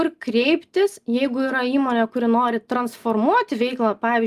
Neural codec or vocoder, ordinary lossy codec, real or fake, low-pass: none; Opus, 32 kbps; real; 14.4 kHz